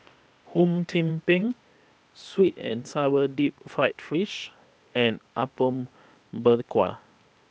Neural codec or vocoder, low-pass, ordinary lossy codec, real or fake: codec, 16 kHz, 0.8 kbps, ZipCodec; none; none; fake